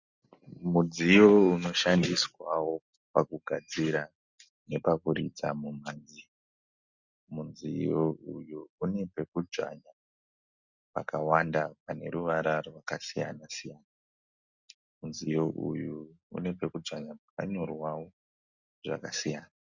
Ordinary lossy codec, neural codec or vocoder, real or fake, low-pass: Opus, 64 kbps; none; real; 7.2 kHz